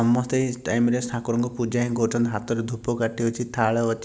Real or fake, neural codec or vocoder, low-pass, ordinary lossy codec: real; none; none; none